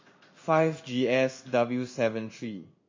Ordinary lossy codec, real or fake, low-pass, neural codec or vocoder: MP3, 32 kbps; fake; 7.2 kHz; codec, 16 kHz in and 24 kHz out, 1 kbps, XY-Tokenizer